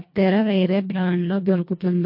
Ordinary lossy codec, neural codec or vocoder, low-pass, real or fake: MP3, 32 kbps; codec, 24 kHz, 1.5 kbps, HILCodec; 5.4 kHz; fake